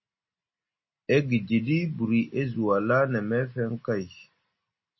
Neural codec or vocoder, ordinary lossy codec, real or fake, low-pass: none; MP3, 24 kbps; real; 7.2 kHz